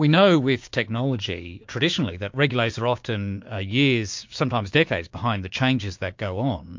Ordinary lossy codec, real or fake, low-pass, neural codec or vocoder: MP3, 48 kbps; fake; 7.2 kHz; codec, 16 kHz, 6 kbps, DAC